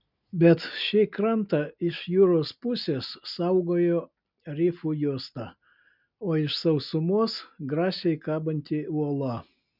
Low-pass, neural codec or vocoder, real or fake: 5.4 kHz; none; real